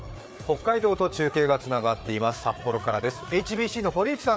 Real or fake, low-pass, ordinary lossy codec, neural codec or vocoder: fake; none; none; codec, 16 kHz, 4 kbps, FreqCodec, larger model